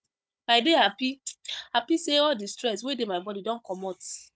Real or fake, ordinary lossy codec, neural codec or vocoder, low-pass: fake; none; codec, 16 kHz, 16 kbps, FunCodec, trained on Chinese and English, 50 frames a second; none